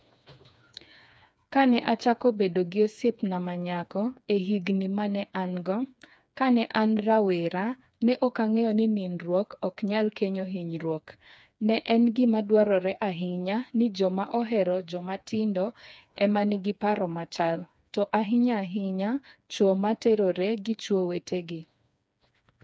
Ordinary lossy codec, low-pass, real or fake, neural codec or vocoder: none; none; fake; codec, 16 kHz, 4 kbps, FreqCodec, smaller model